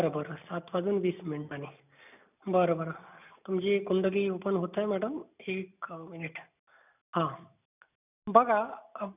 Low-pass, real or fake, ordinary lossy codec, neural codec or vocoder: 3.6 kHz; real; none; none